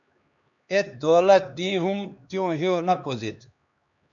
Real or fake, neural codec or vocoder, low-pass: fake; codec, 16 kHz, 2 kbps, X-Codec, HuBERT features, trained on LibriSpeech; 7.2 kHz